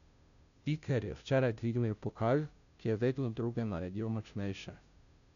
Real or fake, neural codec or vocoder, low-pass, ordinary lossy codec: fake; codec, 16 kHz, 0.5 kbps, FunCodec, trained on Chinese and English, 25 frames a second; 7.2 kHz; none